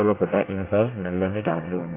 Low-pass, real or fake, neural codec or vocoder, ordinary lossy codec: 3.6 kHz; fake; codec, 24 kHz, 1 kbps, SNAC; AAC, 24 kbps